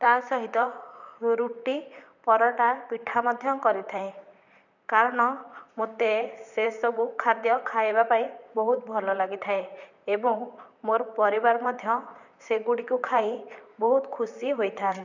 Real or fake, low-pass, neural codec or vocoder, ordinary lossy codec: fake; 7.2 kHz; vocoder, 44.1 kHz, 128 mel bands, Pupu-Vocoder; none